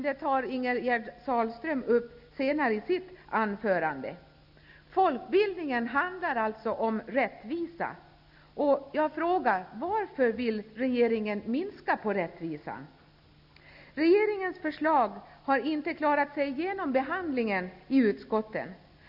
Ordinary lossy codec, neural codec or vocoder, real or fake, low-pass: none; none; real; 5.4 kHz